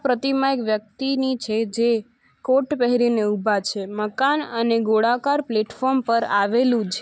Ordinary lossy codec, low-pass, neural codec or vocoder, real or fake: none; none; none; real